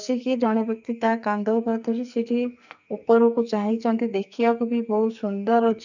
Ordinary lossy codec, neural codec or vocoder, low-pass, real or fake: none; codec, 44.1 kHz, 2.6 kbps, SNAC; 7.2 kHz; fake